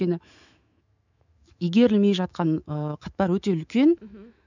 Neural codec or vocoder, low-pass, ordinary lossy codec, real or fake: autoencoder, 48 kHz, 128 numbers a frame, DAC-VAE, trained on Japanese speech; 7.2 kHz; none; fake